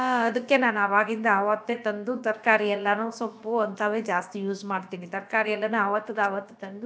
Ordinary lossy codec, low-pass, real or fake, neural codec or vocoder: none; none; fake; codec, 16 kHz, about 1 kbps, DyCAST, with the encoder's durations